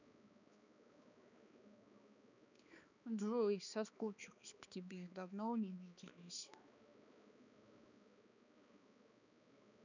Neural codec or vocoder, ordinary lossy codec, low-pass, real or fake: codec, 16 kHz, 2 kbps, X-Codec, HuBERT features, trained on balanced general audio; none; 7.2 kHz; fake